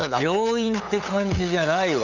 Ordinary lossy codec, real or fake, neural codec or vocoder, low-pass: none; fake; codec, 16 kHz, 8 kbps, FunCodec, trained on LibriTTS, 25 frames a second; 7.2 kHz